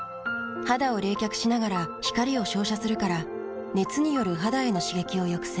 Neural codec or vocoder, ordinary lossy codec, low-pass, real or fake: none; none; none; real